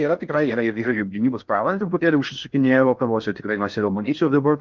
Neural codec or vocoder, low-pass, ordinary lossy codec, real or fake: codec, 16 kHz in and 24 kHz out, 0.6 kbps, FocalCodec, streaming, 4096 codes; 7.2 kHz; Opus, 24 kbps; fake